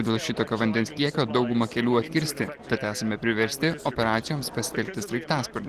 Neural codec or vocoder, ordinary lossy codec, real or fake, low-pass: none; Opus, 16 kbps; real; 14.4 kHz